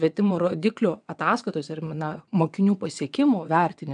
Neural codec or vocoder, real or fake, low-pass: vocoder, 22.05 kHz, 80 mel bands, WaveNeXt; fake; 9.9 kHz